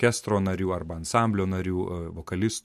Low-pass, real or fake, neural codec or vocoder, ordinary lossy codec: 14.4 kHz; real; none; MP3, 64 kbps